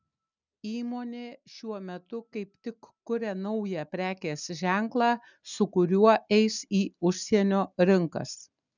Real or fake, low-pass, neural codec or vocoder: real; 7.2 kHz; none